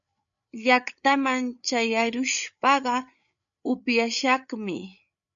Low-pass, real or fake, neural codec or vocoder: 7.2 kHz; fake; codec, 16 kHz, 8 kbps, FreqCodec, larger model